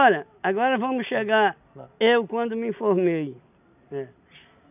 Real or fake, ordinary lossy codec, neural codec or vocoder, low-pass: real; none; none; 3.6 kHz